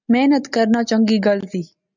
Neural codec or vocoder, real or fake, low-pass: none; real; 7.2 kHz